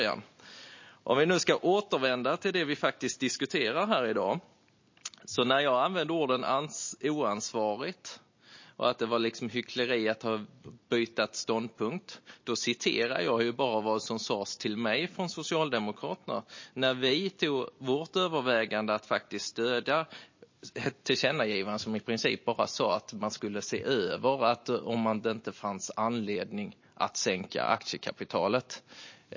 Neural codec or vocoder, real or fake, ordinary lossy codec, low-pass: none; real; MP3, 32 kbps; 7.2 kHz